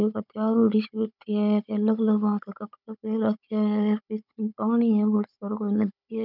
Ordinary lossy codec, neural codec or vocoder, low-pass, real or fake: none; codec, 16 kHz, 16 kbps, FunCodec, trained on Chinese and English, 50 frames a second; 5.4 kHz; fake